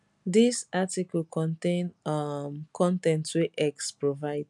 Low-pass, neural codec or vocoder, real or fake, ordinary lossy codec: 10.8 kHz; none; real; none